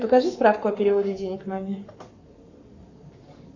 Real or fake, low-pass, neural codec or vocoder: fake; 7.2 kHz; codec, 44.1 kHz, 7.8 kbps, Pupu-Codec